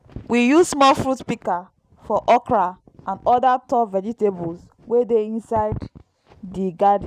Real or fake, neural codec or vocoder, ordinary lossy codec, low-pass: real; none; none; 14.4 kHz